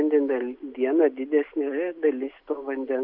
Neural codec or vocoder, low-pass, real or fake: none; 5.4 kHz; real